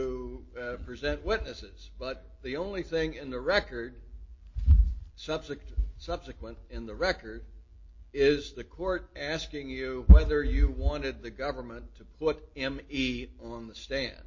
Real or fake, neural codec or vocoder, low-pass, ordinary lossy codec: real; none; 7.2 kHz; MP3, 32 kbps